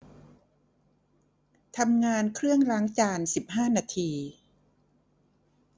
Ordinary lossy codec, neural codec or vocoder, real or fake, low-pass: none; none; real; none